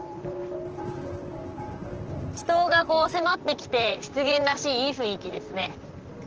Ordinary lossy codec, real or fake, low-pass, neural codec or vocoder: Opus, 16 kbps; fake; 7.2 kHz; vocoder, 44.1 kHz, 128 mel bands, Pupu-Vocoder